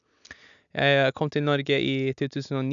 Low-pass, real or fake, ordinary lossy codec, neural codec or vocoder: 7.2 kHz; real; none; none